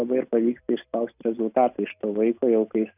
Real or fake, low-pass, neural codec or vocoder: real; 3.6 kHz; none